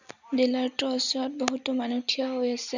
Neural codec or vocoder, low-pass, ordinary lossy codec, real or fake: none; 7.2 kHz; none; real